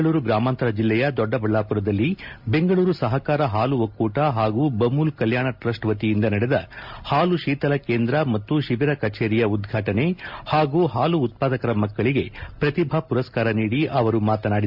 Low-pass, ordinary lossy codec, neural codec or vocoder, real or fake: 5.4 kHz; MP3, 48 kbps; none; real